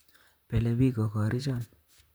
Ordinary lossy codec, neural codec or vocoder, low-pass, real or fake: none; vocoder, 44.1 kHz, 128 mel bands, Pupu-Vocoder; none; fake